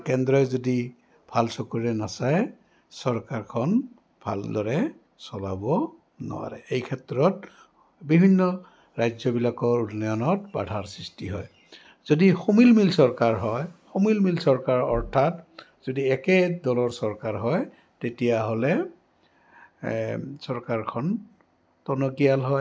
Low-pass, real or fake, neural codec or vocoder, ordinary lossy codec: none; real; none; none